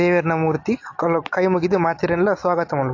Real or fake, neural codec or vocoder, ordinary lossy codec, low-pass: real; none; AAC, 48 kbps; 7.2 kHz